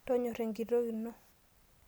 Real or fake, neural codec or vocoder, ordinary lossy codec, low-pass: real; none; none; none